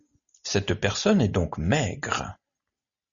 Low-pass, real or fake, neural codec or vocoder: 7.2 kHz; real; none